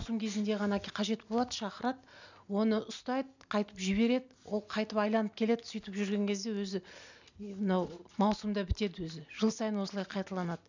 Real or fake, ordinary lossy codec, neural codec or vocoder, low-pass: real; none; none; 7.2 kHz